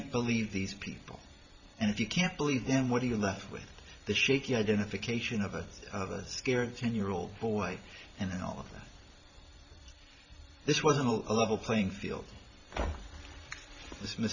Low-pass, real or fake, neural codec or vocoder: 7.2 kHz; fake; vocoder, 44.1 kHz, 128 mel bands every 512 samples, BigVGAN v2